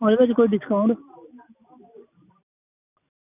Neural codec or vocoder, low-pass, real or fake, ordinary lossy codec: none; 3.6 kHz; real; none